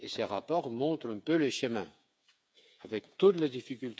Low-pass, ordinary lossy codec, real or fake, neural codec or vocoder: none; none; fake; codec, 16 kHz, 8 kbps, FreqCodec, smaller model